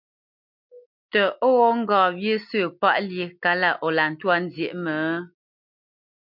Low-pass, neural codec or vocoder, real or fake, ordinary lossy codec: 5.4 kHz; none; real; MP3, 48 kbps